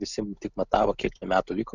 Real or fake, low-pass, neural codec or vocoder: real; 7.2 kHz; none